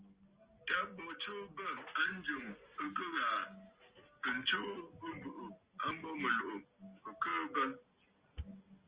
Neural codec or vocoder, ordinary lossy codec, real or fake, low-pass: none; Opus, 24 kbps; real; 3.6 kHz